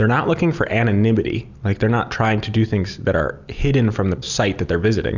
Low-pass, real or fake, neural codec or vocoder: 7.2 kHz; real; none